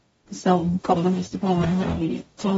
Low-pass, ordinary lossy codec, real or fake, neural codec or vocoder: 19.8 kHz; AAC, 24 kbps; fake; codec, 44.1 kHz, 0.9 kbps, DAC